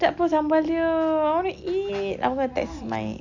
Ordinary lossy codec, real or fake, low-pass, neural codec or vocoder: none; real; 7.2 kHz; none